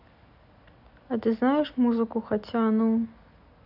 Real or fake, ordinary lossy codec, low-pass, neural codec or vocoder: real; none; 5.4 kHz; none